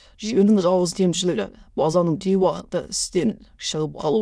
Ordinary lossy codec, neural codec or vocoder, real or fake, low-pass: none; autoencoder, 22.05 kHz, a latent of 192 numbers a frame, VITS, trained on many speakers; fake; none